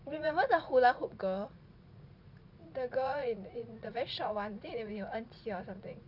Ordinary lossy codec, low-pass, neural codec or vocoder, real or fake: none; 5.4 kHz; vocoder, 44.1 kHz, 80 mel bands, Vocos; fake